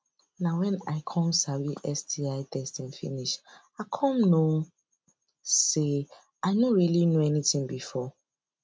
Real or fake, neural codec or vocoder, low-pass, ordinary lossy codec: real; none; none; none